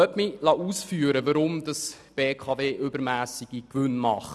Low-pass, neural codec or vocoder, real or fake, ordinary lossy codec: none; none; real; none